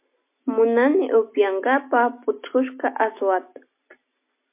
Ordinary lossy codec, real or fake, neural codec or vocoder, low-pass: MP3, 24 kbps; real; none; 3.6 kHz